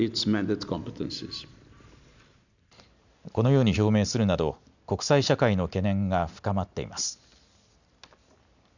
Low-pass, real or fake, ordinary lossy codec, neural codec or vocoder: 7.2 kHz; real; none; none